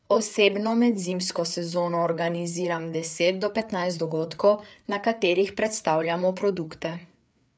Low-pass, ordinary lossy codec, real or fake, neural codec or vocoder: none; none; fake; codec, 16 kHz, 4 kbps, FreqCodec, larger model